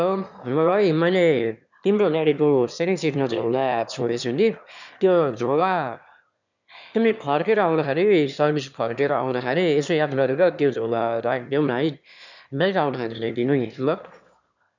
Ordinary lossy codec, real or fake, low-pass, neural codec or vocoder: none; fake; 7.2 kHz; autoencoder, 22.05 kHz, a latent of 192 numbers a frame, VITS, trained on one speaker